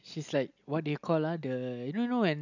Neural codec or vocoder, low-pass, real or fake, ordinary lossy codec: none; 7.2 kHz; real; none